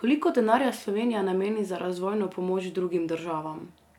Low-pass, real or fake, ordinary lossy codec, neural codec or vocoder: 19.8 kHz; real; none; none